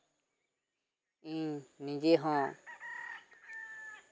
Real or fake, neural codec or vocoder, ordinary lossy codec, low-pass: real; none; none; none